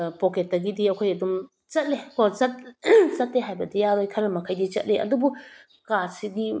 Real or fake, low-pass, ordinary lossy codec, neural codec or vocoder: real; none; none; none